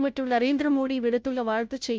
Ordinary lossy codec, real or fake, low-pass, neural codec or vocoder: none; fake; none; codec, 16 kHz, 0.5 kbps, FunCodec, trained on Chinese and English, 25 frames a second